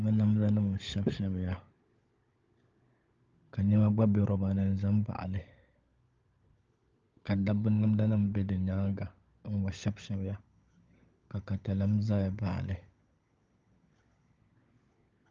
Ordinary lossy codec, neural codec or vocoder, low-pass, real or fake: Opus, 24 kbps; codec, 16 kHz, 16 kbps, FunCodec, trained on LibriTTS, 50 frames a second; 7.2 kHz; fake